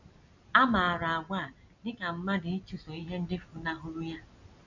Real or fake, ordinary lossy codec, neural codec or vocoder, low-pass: real; none; none; 7.2 kHz